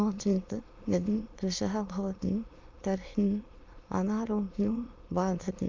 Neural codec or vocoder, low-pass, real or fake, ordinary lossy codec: autoencoder, 22.05 kHz, a latent of 192 numbers a frame, VITS, trained on many speakers; 7.2 kHz; fake; Opus, 24 kbps